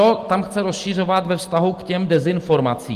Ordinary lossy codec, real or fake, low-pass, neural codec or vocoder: Opus, 24 kbps; real; 14.4 kHz; none